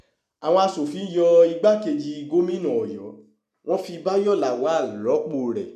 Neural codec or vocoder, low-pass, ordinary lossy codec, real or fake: none; 14.4 kHz; none; real